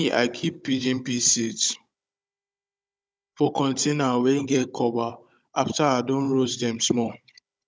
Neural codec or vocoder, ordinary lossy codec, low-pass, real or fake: codec, 16 kHz, 16 kbps, FunCodec, trained on Chinese and English, 50 frames a second; none; none; fake